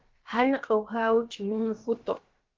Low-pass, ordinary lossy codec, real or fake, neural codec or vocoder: 7.2 kHz; Opus, 16 kbps; fake; codec, 16 kHz, about 1 kbps, DyCAST, with the encoder's durations